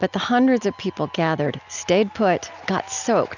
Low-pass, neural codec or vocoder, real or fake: 7.2 kHz; none; real